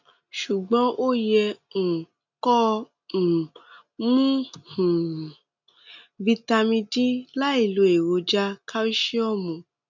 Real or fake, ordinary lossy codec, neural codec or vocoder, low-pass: real; none; none; 7.2 kHz